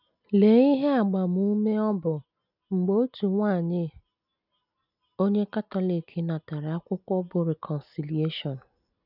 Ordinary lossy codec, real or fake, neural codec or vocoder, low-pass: none; real; none; 5.4 kHz